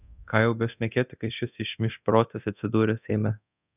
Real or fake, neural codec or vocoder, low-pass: fake; codec, 24 kHz, 0.9 kbps, DualCodec; 3.6 kHz